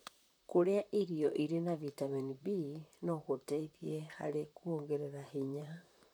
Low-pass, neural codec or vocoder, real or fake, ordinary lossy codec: none; vocoder, 44.1 kHz, 128 mel bands, Pupu-Vocoder; fake; none